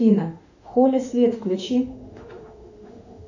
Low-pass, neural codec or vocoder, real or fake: 7.2 kHz; autoencoder, 48 kHz, 32 numbers a frame, DAC-VAE, trained on Japanese speech; fake